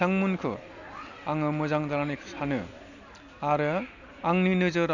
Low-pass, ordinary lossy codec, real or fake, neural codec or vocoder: 7.2 kHz; none; real; none